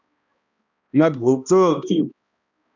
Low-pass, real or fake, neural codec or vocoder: 7.2 kHz; fake; codec, 16 kHz, 1 kbps, X-Codec, HuBERT features, trained on balanced general audio